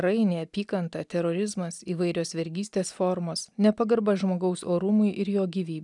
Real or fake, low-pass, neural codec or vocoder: real; 10.8 kHz; none